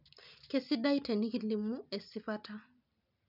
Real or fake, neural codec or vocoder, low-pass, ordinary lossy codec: real; none; 5.4 kHz; none